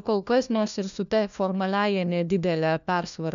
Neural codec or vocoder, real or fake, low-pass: codec, 16 kHz, 1 kbps, FunCodec, trained on LibriTTS, 50 frames a second; fake; 7.2 kHz